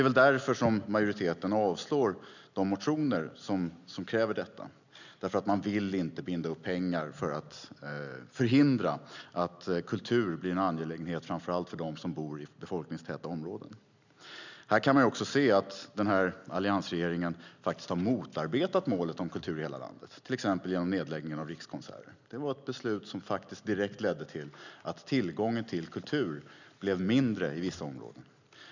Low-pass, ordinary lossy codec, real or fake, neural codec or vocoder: 7.2 kHz; none; real; none